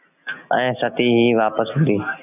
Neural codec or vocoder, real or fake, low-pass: none; real; 3.6 kHz